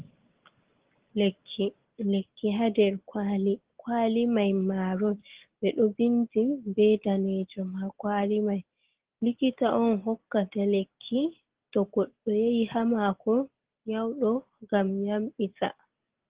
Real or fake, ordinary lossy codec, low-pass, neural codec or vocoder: real; Opus, 16 kbps; 3.6 kHz; none